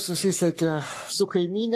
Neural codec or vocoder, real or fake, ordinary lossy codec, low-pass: codec, 44.1 kHz, 3.4 kbps, Pupu-Codec; fake; AAC, 64 kbps; 14.4 kHz